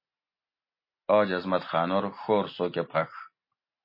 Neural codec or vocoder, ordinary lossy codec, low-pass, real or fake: none; MP3, 24 kbps; 5.4 kHz; real